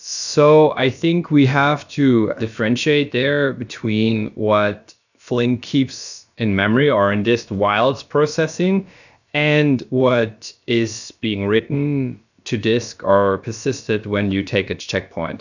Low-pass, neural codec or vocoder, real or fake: 7.2 kHz; codec, 16 kHz, about 1 kbps, DyCAST, with the encoder's durations; fake